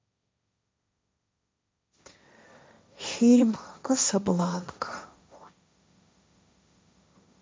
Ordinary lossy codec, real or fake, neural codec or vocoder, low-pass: none; fake; codec, 16 kHz, 1.1 kbps, Voila-Tokenizer; none